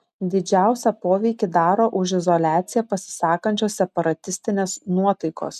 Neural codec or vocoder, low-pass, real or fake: none; 14.4 kHz; real